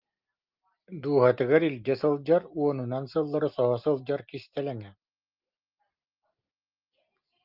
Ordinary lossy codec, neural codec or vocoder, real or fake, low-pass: Opus, 24 kbps; none; real; 5.4 kHz